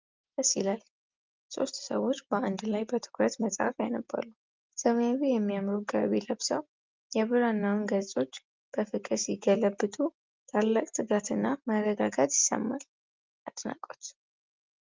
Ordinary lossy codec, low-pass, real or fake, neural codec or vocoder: Opus, 24 kbps; 7.2 kHz; real; none